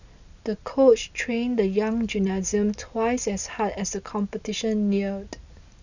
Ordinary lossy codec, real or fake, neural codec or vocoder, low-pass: none; real; none; 7.2 kHz